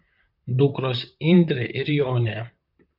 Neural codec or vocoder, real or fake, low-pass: vocoder, 44.1 kHz, 128 mel bands, Pupu-Vocoder; fake; 5.4 kHz